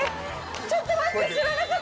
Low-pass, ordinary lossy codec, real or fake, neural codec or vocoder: none; none; real; none